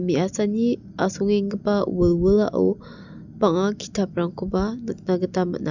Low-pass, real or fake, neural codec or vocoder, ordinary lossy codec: 7.2 kHz; real; none; none